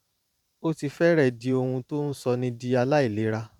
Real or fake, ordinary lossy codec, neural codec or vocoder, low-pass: fake; none; vocoder, 44.1 kHz, 128 mel bands every 512 samples, BigVGAN v2; 19.8 kHz